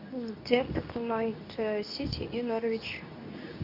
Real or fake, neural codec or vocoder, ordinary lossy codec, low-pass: fake; codec, 24 kHz, 0.9 kbps, WavTokenizer, medium speech release version 2; Opus, 64 kbps; 5.4 kHz